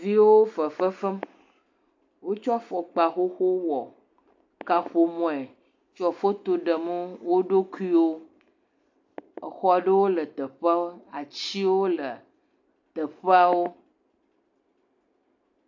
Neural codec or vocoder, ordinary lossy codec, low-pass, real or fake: none; AAC, 48 kbps; 7.2 kHz; real